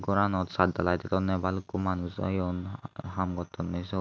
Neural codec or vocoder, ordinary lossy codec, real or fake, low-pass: none; none; real; none